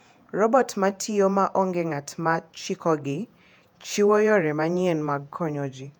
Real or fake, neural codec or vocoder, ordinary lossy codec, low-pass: fake; vocoder, 48 kHz, 128 mel bands, Vocos; none; 19.8 kHz